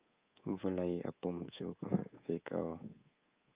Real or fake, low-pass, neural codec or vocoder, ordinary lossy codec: fake; 3.6 kHz; codec, 24 kHz, 3.1 kbps, DualCodec; Opus, 24 kbps